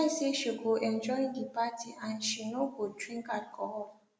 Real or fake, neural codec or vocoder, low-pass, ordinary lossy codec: real; none; none; none